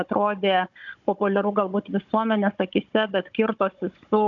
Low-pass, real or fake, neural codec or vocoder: 7.2 kHz; fake; codec, 16 kHz, 16 kbps, FunCodec, trained on Chinese and English, 50 frames a second